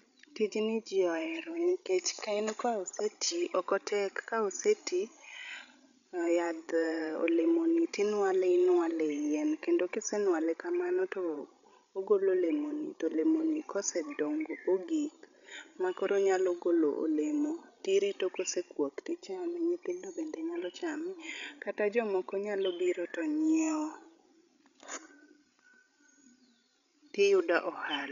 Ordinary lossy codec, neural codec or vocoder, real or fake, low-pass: none; codec, 16 kHz, 16 kbps, FreqCodec, larger model; fake; 7.2 kHz